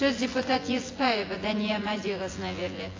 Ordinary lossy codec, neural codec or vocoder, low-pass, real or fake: AAC, 32 kbps; vocoder, 24 kHz, 100 mel bands, Vocos; 7.2 kHz; fake